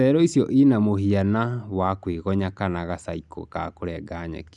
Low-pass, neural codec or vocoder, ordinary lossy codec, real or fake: 10.8 kHz; none; none; real